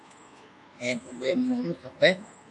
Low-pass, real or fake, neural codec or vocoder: 10.8 kHz; fake; codec, 24 kHz, 1.2 kbps, DualCodec